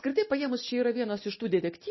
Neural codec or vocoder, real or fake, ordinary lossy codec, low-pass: none; real; MP3, 24 kbps; 7.2 kHz